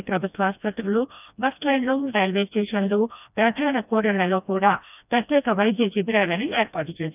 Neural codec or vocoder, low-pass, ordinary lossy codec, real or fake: codec, 16 kHz, 1 kbps, FreqCodec, smaller model; 3.6 kHz; none; fake